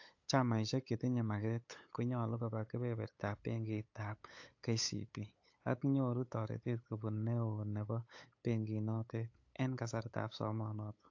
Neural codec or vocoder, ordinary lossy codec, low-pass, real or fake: codec, 16 kHz, 8 kbps, FunCodec, trained on LibriTTS, 25 frames a second; AAC, 48 kbps; 7.2 kHz; fake